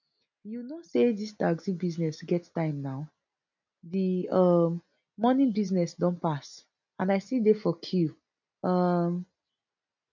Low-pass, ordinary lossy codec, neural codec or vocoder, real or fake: 7.2 kHz; none; none; real